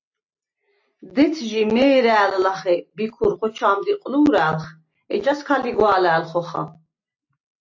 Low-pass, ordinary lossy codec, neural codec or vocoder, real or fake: 7.2 kHz; AAC, 48 kbps; none; real